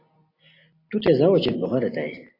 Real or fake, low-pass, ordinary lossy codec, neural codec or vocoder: real; 5.4 kHz; AAC, 32 kbps; none